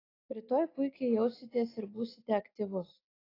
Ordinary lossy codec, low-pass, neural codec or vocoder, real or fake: AAC, 24 kbps; 5.4 kHz; none; real